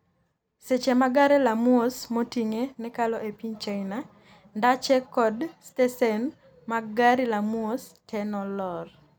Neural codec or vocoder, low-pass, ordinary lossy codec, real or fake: none; none; none; real